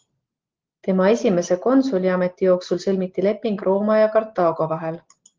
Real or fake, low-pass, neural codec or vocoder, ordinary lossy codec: real; 7.2 kHz; none; Opus, 16 kbps